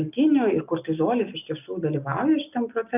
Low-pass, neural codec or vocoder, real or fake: 3.6 kHz; none; real